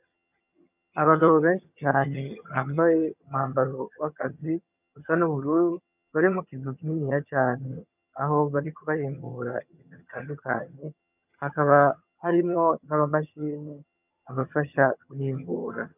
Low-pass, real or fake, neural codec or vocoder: 3.6 kHz; fake; vocoder, 22.05 kHz, 80 mel bands, HiFi-GAN